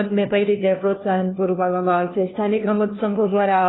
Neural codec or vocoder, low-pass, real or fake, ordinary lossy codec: codec, 16 kHz, 1 kbps, FunCodec, trained on LibriTTS, 50 frames a second; 7.2 kHz; fake; AAC, 16 kbps